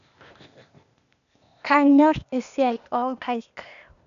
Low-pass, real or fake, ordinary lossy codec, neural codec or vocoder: 7.2 kHz; fake; none; codec, 16 kHz, 0.8 kbps, ZipCodec